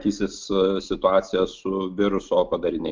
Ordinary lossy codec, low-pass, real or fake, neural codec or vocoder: Opus, 24 kbps; 7.2 kHz; real; none